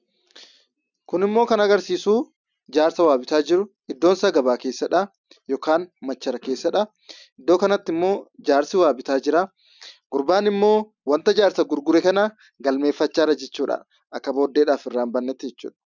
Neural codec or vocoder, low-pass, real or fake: none; 7.2 kHz; real